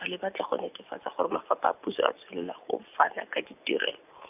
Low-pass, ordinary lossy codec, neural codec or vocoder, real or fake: 3.6 kHz; none; none; real